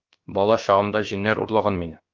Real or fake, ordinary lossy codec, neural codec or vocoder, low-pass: fake; Opus, 32 kbps; codec, 16 kHz, about 1 kbps, DyCAST, with the encoder's durations; 7.2 kHz